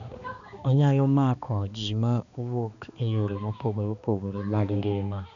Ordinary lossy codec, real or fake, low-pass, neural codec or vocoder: none; fake; 7.2 kHz; codec, 16 kHz, 2 kbps, X-Codec, HuBERT features, trained on balanced general audio